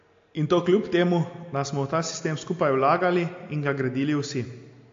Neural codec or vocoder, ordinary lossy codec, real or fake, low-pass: none; AAC, 48 kbps; real; 7.2 kHz